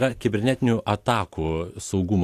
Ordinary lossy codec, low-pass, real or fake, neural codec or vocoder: AAC, 64 kbps; 14.4 kHz; real; none